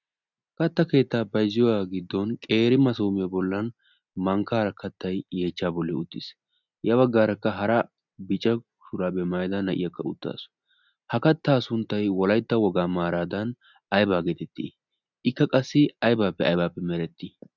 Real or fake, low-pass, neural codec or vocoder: real; 7.2 kHz; none